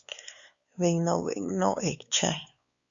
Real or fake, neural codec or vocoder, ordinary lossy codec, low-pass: fake; codec, 16 kHz, 4 kbps, X-Codec, WavLM features, trained on Multilingual LibriSpeech; Opus, 64 kbps; 7.2 kHz